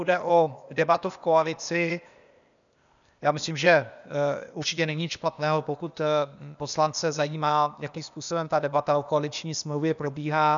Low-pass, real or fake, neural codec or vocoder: 7.2 kHz; fake; codec, 16 kHz, 0.8 kbps, ZipCodec